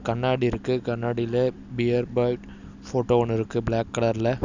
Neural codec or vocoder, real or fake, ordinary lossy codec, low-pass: none; real; none; 7.2 kHz